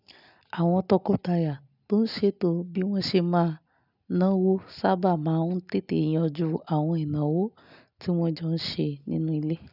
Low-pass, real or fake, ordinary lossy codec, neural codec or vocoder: 5.4 kHz; real; none; none